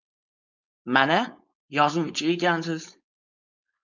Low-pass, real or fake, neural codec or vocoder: 7.2 kHz; fake; codec, 16 kHz, 4.8 kbps, FACodec